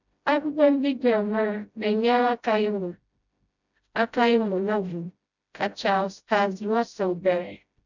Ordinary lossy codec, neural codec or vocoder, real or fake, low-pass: none; codec, 16 kHz, 0.5 kbps, FreqCodec, smaller model; fake; 7.2 kHz